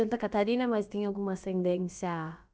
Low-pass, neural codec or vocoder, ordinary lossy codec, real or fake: none; codec, 16 kHz, about 1 kbps, DyCAST, with the encoder's durations; none; fake